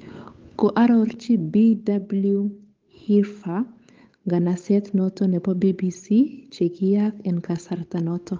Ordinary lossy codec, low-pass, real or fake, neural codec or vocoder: Opus, 24 kbps; 7.2 kHz; fake; codec, 16 kHz, 8 kbps, FunCodec, trained on Chinese and English, 25 frames a second